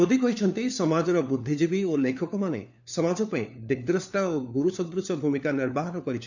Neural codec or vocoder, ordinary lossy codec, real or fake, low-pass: codec, 16 kHz, 4 kbps, FunCodec, trained on LibriTTS, 50 frames a second; none; fake; 7.2 kHz